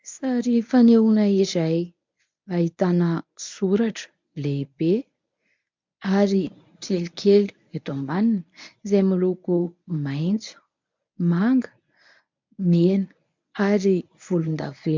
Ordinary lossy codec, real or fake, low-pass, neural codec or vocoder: MP3, 64 kbps; fake; 7.2 kHz; codec, 24 kHz, 0.9 kbps, WavTokenizer, medium speech release version 1